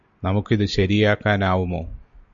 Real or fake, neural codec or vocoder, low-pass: real; none; 7.2 kHz